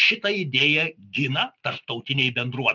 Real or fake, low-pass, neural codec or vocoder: real; 7.2 kHz; none